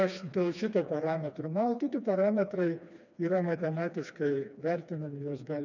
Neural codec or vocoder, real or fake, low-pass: codec, 16 kHz, 2 kbps, FreqCodec, smaller model; fake; 7.2 kHz